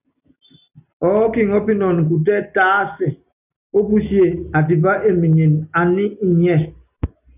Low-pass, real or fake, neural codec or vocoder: 3.6 kHz; real; none